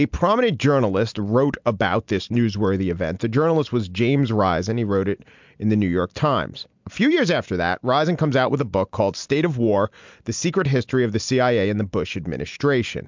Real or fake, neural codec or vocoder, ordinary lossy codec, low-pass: real; none; MP3, 64 kbps; 7.2 kHz